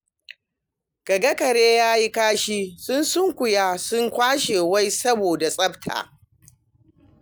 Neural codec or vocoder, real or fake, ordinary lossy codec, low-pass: none; real; none; none